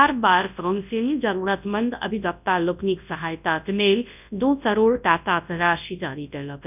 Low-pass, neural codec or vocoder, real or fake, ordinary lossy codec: 3.6 kHz; codec, 24 kHz, 0.9 kbps, WavTokenizer, large speech release; fake; none